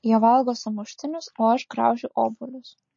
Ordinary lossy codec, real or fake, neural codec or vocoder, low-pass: MP3, 32 kbps; real; none; 7.2 kHz